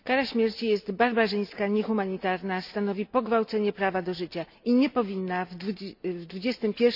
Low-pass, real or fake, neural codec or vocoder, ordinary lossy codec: 5.4 kHz; real; none; none